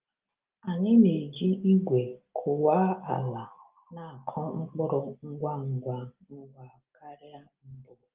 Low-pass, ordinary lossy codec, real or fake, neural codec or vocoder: 3.6 kHz; Opus, 32 kbps; real; none